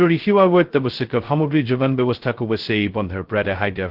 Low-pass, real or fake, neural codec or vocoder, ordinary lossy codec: 5.4 kHz; fake; codec, 16 kHz, 0.2 kbps, FocalCodec; Opus, 16 kbps